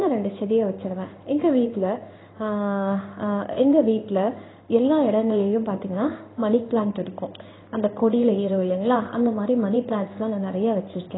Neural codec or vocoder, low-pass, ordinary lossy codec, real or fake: codec, 16 kHz in and 24 kHz out, 1 kbps, XY-Tokenizer; 7.2 kHz; AAC, 16 kbps; fake